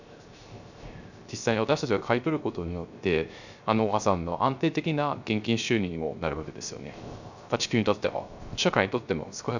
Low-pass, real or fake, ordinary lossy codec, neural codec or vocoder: 7.2 kHz; fake; none; codec, 16 kHz, 0.3 kbps, FocalCodec